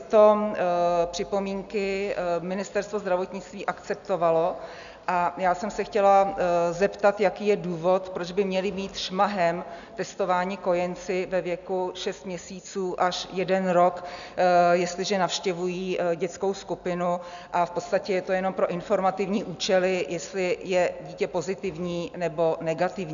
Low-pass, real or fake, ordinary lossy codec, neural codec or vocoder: 7.2 kHz; real; MP3, 96 kbps; none